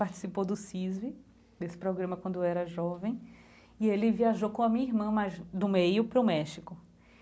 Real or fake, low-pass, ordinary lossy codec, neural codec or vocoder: real; none; none; none